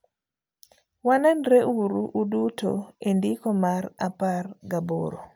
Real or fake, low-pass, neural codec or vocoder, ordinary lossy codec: real; none; none; none